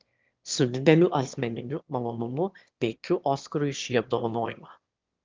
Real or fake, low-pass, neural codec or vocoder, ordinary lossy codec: fake; 7.2 kHz; autoencoder, 22.05 kHz, a latent of 192 numbers a frame, VITS, trained on one speaker; Opus, 24 kbps